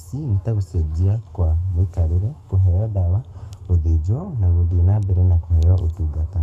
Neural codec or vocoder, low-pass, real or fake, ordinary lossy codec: codec, 44.1 kHz, 7.8 kbps, Pupu-Codec; 14.4 kHz; fake; none